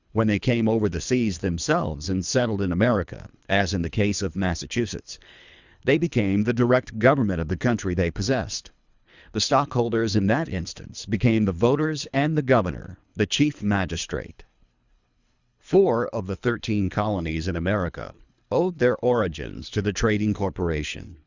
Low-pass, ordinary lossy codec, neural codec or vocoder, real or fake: 7.2 kHz; Opus, 64 kbps; codec, 24 kHz, 3 kbps, HILCodec; fake